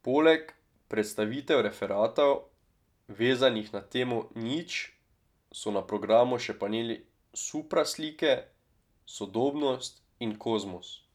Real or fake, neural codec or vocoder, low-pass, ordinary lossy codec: real; none; 19.8 kHz; none